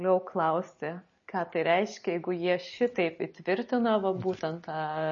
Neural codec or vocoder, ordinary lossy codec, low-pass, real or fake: none; MP3, 32 kbps; 7.2 kHz; real